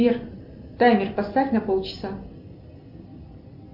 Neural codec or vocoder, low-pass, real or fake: none; 5.4 kHz; real